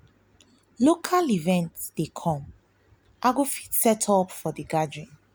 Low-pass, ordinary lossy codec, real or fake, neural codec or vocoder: none; none; real; none